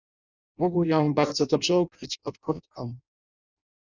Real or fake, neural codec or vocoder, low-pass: fake; codec, 16 kHz in and 24 kHz out, 0.6 kbps, FireRedTTS-2 codec; 7.2 kHz